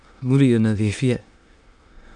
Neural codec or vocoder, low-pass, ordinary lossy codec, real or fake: autoencoder, 22.05 kHz, a latent of 192 numbers a frame, VITS, trained on many speakers; 9.9 kHz; none; fake